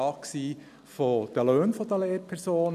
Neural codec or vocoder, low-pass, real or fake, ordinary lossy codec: none; 14.4 kHz; real; none